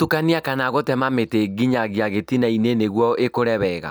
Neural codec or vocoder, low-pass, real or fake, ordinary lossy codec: none; none; real; none